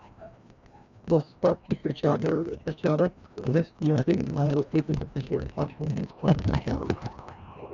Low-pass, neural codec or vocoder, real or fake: 7.2 kHz; codec, 16 kHz, 1 kbps, FreqCodec, larger model; fake